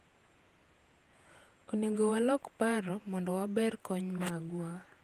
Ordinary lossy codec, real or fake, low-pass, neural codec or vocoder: Opus, 32 kbps; fake; 14.4 kHz; vocoder, 48 kHz, 128 mel bands, Vocos